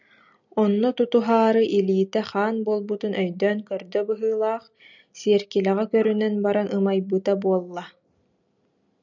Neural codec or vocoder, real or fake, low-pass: none; real; 7.2 kHz